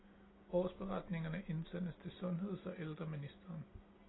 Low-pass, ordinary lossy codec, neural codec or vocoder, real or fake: 7.2 kHz; AAC, 16 kbps; none; real